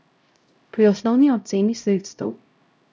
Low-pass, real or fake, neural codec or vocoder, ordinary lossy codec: none; fake; codec, 16 kHz, 0.5 kbps, X-Codec, HuBERT features, trained on LibriSpeech; none